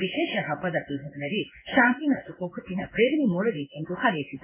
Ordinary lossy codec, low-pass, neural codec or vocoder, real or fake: AAC, 32 kbps; 3.6 kHz; codec, 16 kHz in and 24 kHz out, 1 kbps, XY-Tokenizer; fake